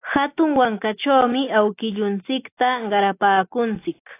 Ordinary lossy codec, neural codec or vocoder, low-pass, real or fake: AAC, 24 kbps; none; 3.6 kHz; real